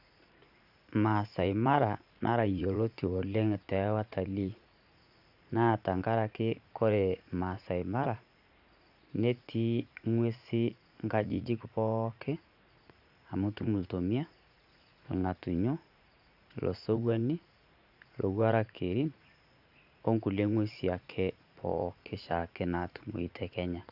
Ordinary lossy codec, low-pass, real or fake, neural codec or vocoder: Opus, 64 kbps; 5.4 kHz; fake; vocoder, 44.1 kHz, 80 mel bands, Vocos